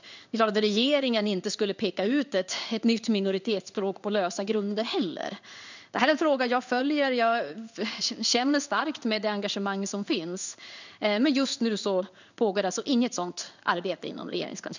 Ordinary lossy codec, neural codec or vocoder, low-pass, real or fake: none; codec, 16 kHz in and 24 kHz out, 1 kbps, XY-Tokenizer; 7.2 kHz; fake